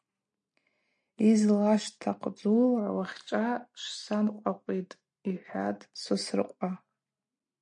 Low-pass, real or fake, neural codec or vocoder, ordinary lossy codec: 9.9 kHz; real; none; MP3, 48 kbps